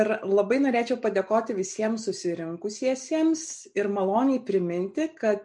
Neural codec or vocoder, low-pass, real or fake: none; 10.8 kHz; real